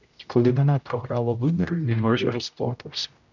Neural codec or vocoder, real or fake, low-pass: codec, 16 kHz, 0.5 kbps, X-Codec, HuBERT features, trained on general audio; fake; 7.2 kHz